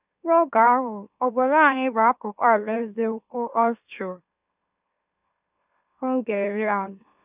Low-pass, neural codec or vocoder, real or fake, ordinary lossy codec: 3.6 kHz; autoencoder, 44.1 kHz, a latent of 192 numbers a frame, MeloTTS; fake; none